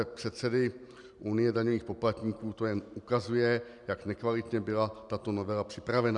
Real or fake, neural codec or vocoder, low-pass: real; none; 10.8 kHz